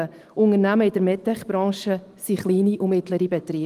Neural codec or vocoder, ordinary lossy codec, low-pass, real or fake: none; Opus, 24 kbps; 14.4 kHz; real